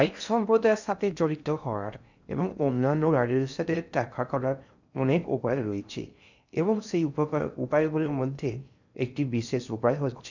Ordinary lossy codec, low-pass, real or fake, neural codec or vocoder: none; 7.2 kHz; fake; codec, 16 kHz in and 24 kHz out, 0.6 kbps, FocalCodec, streaming, 2048 codes